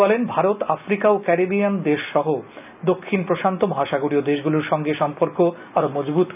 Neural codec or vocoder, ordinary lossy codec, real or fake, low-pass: none; none; real; 3.6 kHz